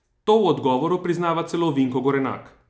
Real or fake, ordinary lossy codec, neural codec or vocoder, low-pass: real; none; none; none